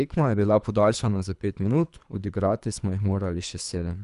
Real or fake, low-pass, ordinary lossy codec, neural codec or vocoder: fake; 10.8 kHz; none; codec, 24 kHz, 3 kbps, HILCodec